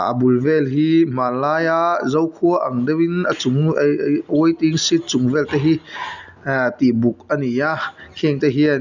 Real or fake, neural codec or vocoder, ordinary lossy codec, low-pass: real; none; none; 7.2 kHz